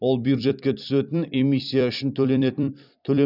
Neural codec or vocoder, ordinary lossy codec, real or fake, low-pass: vocoder, 44.1 kHz, 128 mel bands every 256 samples, BigVGAN v2; none; fake; 5.4 kHz